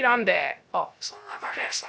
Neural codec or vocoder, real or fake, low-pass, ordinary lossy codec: codec, 16 kHz, 0.3 kbps, FocalCodec; fake; none; none